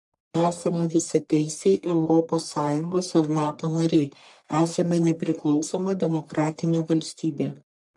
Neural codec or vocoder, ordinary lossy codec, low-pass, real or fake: codec, 44.1 kHz, 1.7 kbps, Pupu-Codec; MP3, 64 kbps; 10.8 kHz; fake